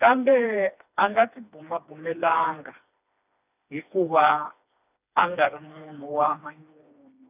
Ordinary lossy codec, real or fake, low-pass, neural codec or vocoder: none; fake; 3.6 kHz; codec, 16 kHz, 2 kbps, FreqCodec, smaller model